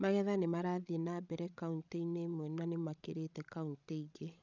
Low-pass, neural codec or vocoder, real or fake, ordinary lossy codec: 7.2 kHz; codec, 16 kHz, 16 kbps, FreqCodec, larger model; fake; none